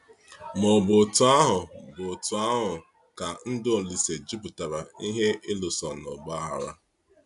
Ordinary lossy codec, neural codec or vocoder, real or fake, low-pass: AAC, 96 kbps; none; real; 10.8 kHz